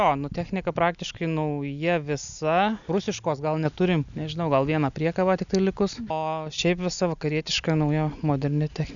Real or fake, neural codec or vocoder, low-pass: real; none; 7.2 kHz